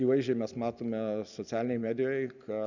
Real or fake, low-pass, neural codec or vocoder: real; 7.2 kHz; none